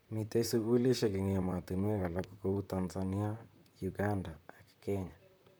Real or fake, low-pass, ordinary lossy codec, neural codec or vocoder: fake; none; none; vocoder, 44.1 kHz, 128 mel bands, Pupu-Vocoder